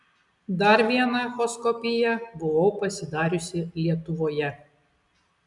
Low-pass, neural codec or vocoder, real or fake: 10.8 kHz; none; real